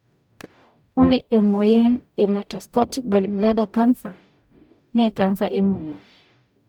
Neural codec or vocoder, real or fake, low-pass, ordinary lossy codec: codec, 44.1 kHz, 0.9 kbps, DAC; fake; 19.8 kHz; none